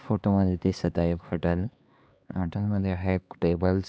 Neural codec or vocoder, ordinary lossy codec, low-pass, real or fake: codec, 16 kHz, 2 kbps, X-Codec, HuBERT features, trained on LibriSpeech; none; none; fake